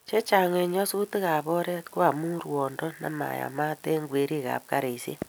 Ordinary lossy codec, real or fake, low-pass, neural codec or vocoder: none; real; none; none